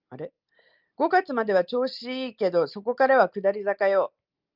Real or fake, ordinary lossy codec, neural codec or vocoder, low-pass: real; Opus, 24 kbps; none; 5.4 kHz